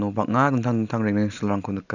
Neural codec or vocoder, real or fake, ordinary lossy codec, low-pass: none; real; none; 7.2 kHz